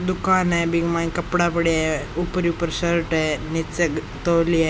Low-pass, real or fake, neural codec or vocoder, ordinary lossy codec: none; real; none; none